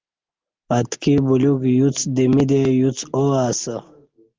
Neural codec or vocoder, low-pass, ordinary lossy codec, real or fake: none; 7.2 kHz; Opus, 16 kbps; real